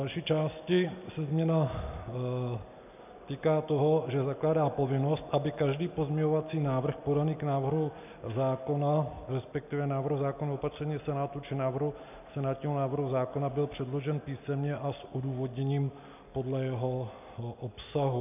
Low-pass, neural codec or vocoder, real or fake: 3.6 kHz; none; real